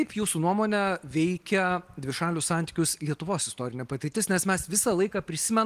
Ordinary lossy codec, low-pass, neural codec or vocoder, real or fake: Opus, 24 kbps; 14.4 kHz; none; real